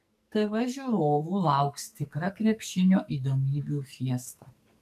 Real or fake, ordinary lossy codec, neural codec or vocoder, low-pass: fake; MP3, 96 kbps; codec, 44.1 kHz, 2.6 kbps, SNAC; 14.4 kHz